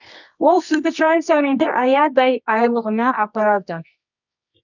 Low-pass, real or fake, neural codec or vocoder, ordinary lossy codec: 7.2 kHz; fake; codec, 24 kHz, 0.9 kbps, WavTokenizer, medium music audio release; none